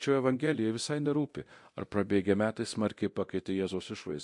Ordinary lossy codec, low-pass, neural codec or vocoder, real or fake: MP3, 48 kbps; 10.8 kHz; codec, 24 kHz, 0.9 kbps, DualCodec; fake